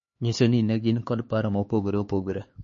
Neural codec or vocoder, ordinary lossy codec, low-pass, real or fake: codec, 16 kHz, 4 kbps, X-Codec, HuBERT features, trained on LibriSpeech; MP3, 32 kbps; 7.2 kHz; fake